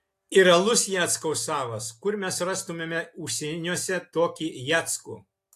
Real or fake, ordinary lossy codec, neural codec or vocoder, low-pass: real; AAC, 64 kbps; none; 14.4 kHz